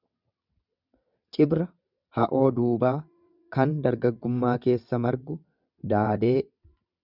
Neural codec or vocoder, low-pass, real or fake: vocoder, 22.05 kHz, 80 mel bands, WaveNeXt; 5.4 kHz; fake